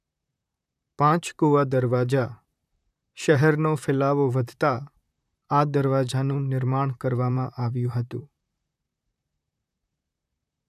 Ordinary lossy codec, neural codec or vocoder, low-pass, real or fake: none; vocoder, 44.1 kHz, 128 mel bands, Pupu-Vocoder; 14.4 kHz; fake